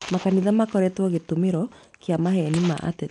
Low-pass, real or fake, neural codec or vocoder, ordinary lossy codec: 10.8 kHz; real; none; none